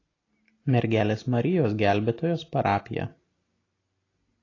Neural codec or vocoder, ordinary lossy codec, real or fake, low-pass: none; AAC, 32 kbps; real; 7.2 kHz